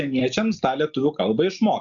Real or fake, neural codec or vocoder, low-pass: real; none; 7.2 kHz